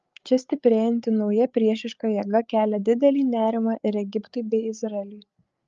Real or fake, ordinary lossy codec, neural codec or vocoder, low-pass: fake; Opus, 24 kbps; codec, 16 kHz, 8 kbps, FreqCodec, larger model; 7.2 kHz